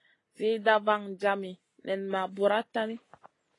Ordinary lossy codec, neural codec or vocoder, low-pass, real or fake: AAC, 32 kbps; none; 10.8 kHz; real